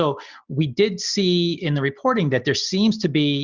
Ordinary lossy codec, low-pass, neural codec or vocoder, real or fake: Opus, 64 kbps; 7.2 kHz; none; real